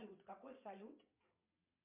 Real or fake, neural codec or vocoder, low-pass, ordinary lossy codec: fake; vocoder, 22.05 kHz, 80 mel bands, WaveNeXt; 3.6 kHz; MP3, 32 kbps